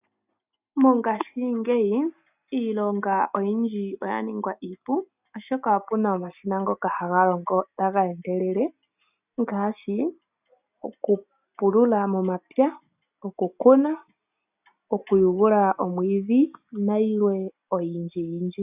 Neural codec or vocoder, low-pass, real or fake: none; 3.6 kHz; real